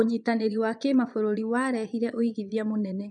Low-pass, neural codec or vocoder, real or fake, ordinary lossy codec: 9.9 kHz; vocoder, 22.05 kHz, 80 mel bands, Vocos; fake; none